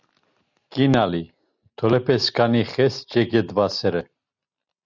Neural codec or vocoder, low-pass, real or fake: none; 7.2 kHz; real